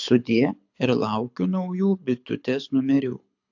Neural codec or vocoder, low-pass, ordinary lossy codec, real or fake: codec, 24 kHz, 6 kbps, HILCodec; 7.2 kHz; AAC, 48 kbps; fake